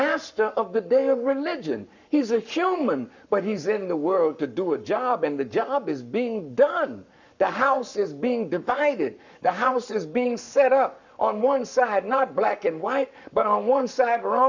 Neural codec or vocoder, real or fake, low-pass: codec, 44.1 kHz, 7.8 kbps, Pupu-Codec; fake; 7.2 kHz